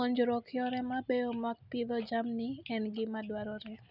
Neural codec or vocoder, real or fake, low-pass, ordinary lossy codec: none; real; 5.4 kHz; none